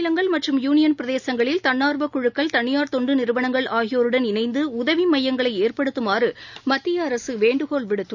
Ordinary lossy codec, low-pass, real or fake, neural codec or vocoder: none; 7.2 kHz; real; none